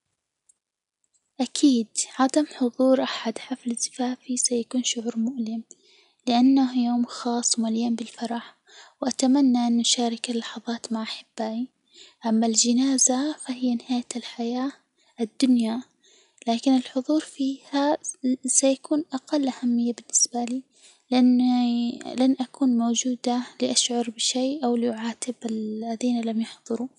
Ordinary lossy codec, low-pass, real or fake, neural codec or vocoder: none; 10.8 kHz; real; none